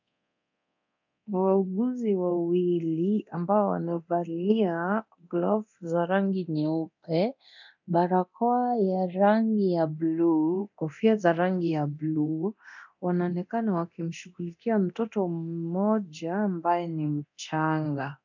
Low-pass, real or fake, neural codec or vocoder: 7.2 kHz; fake; codec, 24 kHz, 0.9 kbps, DualCodec